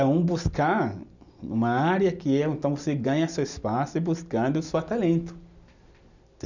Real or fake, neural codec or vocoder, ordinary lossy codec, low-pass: real; none; none; 7.2 kHz